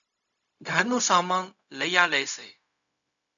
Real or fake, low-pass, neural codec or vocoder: fake; 7.2 kHz; codec, 16 kHz, 0.4 kbps, LongCat-Audio-Codec